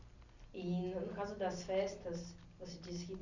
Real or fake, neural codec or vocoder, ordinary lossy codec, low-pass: real; none; none; 7.2 kHz